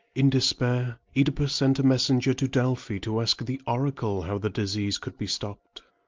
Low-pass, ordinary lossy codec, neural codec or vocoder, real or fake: 7.2 kHz; Opus, 32 kbps; none; real